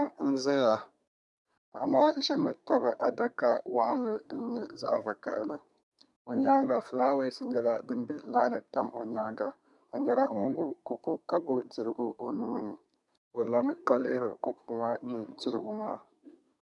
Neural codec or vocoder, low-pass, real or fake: codec, 24 kHz, 1 kbps, SNAC; 10.8 kHz; fake